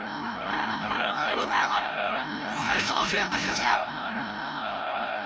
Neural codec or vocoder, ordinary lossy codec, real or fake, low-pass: codec, 16 kHz, 0.5 kbps, FreqCodec, larger model; none; fake; none